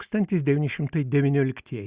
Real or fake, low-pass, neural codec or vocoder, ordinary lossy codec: real; 3.6 kHz; none; Opus, 64 kbps